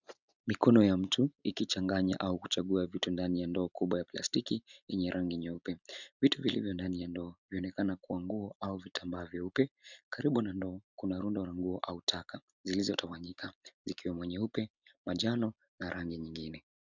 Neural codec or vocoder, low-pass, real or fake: none; 7.2 kHz; real